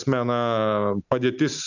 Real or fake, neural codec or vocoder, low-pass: real; none; 7.2 kHz